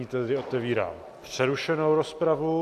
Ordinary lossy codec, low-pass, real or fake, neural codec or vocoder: AAC, 64 kbps; 14.4 kHz; real; none